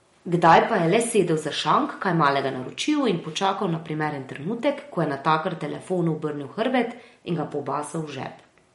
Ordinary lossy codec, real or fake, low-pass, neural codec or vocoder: MP3, 48 kbps; real; 19.8 kHz; none